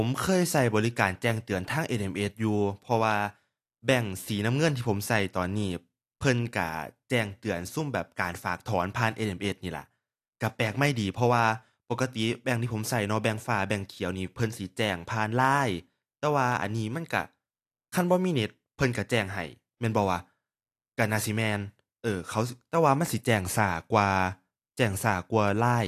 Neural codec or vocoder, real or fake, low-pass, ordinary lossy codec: none; real; 14.4 kHz; AAC, 64 kbps